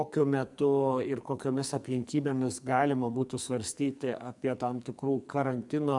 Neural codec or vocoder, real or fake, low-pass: codec, 44.1 kHz, 2.6 kbps, SNAC; fake; 10.8 kHz